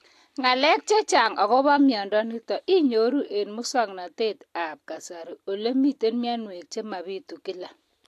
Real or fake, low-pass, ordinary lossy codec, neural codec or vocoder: real; 14.4 kHz; AAC, 64 kbps; none